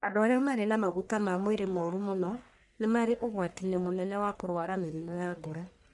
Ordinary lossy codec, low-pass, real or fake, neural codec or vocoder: none; 10.8 kHz; fake; codec, 44.1 kHz, 1.7 kbps, Pupu-Codec